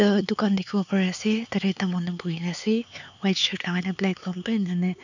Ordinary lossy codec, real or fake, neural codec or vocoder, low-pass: none; fake; codec, 16 kHz, 4 kbps, X-Codec, HuBERT features, trained on LibriSpeech; 7.2 kHz